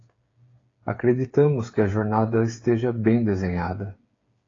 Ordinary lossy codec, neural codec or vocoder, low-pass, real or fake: AAC, 32 kbps; codec, 16 kHz, 8 kbps, FreqCodec, smaller model; 7.2 kHz; fake